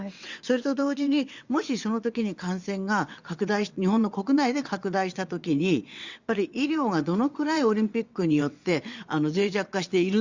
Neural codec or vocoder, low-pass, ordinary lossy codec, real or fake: vocoder, 22.05 kHz, 80 mel bands, WaveNeXt; 7.2 kHz; Opus, 64 kbps; fake